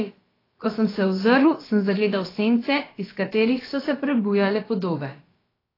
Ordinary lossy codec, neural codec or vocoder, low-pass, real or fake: AAC, 24 kbps; codec, 16 kHz, about 1 kbps, DyCAST, with the encoder's durations; 5.4 kHz; fake